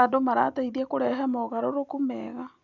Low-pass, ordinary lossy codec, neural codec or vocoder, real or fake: 7.2 kHz; none; none; real